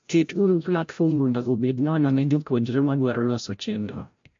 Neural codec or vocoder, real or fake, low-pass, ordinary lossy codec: codec, 16 kHz, 0.5 kbps, FreqCodec, larger model; fake; 7.2 kHz; MP3, 48 kbps